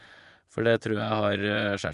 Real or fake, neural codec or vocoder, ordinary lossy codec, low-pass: fake; vocoder, 24 kHz, 100 mel bands, Vocos; AAC, 96 kbps; 10.8 kHz